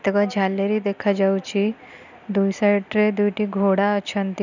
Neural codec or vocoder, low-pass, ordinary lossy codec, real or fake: none; 7.2 kHz; none; real